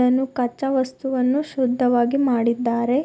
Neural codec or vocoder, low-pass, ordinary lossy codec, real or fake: none; none; none; real